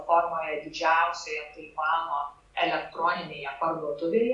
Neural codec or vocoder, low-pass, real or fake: none; 10.8 kHz; real